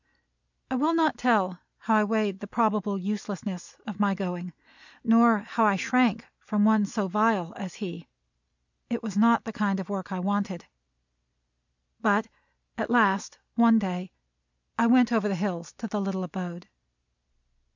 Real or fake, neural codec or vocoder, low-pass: real; none; 7.2 kHz